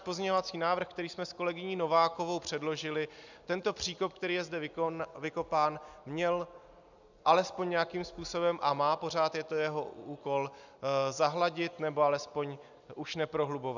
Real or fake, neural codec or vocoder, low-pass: real; none; 7.2 kHz